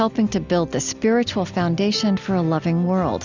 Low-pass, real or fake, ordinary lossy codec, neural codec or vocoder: 7.2 kHz; real; Opus, 64 kbps; none